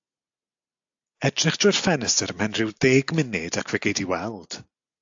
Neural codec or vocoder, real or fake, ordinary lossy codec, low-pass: none; real; AAC, 64 kbps; 7.2 kHz